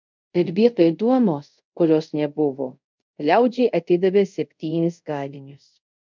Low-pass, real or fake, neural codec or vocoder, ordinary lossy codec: 7.2 kHz; fake; codec, 24 kHz, 0.5 kbps, DualCodec; MP3, 64 kbps